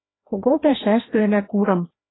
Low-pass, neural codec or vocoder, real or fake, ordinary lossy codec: 7.2 kHz; codec, 16 kHz, 1 kbps, FreqCodec, larger model; fake; AAC, 16 kbps